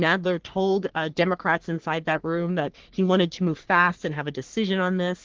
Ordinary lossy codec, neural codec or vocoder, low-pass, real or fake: Opus, 16 kbps; codec, 44.1 kHz, 3.4 kbps, Pupu-Codec; 7.2 kHz; fake